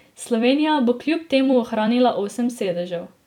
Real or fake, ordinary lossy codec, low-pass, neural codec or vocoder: fake; none; 19.8 kHz; vocoder, 44.1 kHz, 128 mel bands every 256 samples, BigVGAN v2